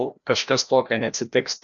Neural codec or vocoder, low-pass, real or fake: codec, 16 kHz, 1 kbps, FreqCodec, larger model; 7.2 kHz; fake